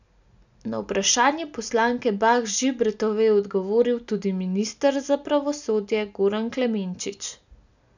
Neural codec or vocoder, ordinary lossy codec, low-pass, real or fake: none; none; 7.2 kHz; real